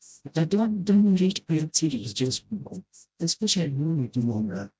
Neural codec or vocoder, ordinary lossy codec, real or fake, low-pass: codec, 16 kHz, 0.5 kbps, FreqCodec, smaller model; none; fake; none